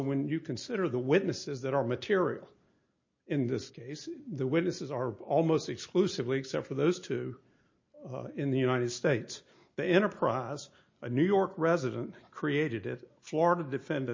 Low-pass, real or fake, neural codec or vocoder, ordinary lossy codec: 7.2 kHz; real; none; MP3, 32 kbps